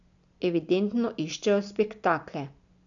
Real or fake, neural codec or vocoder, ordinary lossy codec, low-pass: real; none; none; 7.2 kHz